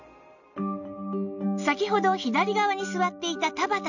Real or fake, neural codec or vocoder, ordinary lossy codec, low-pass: real; none; none; 7.2 kHz